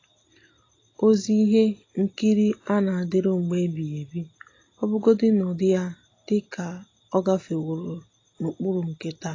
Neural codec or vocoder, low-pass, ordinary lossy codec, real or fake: none; 7.2 kHz; AAC, 32 kbps; real